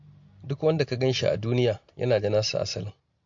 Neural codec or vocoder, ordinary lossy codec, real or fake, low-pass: none; MP3, 48 kbps; real; 7.2 kHz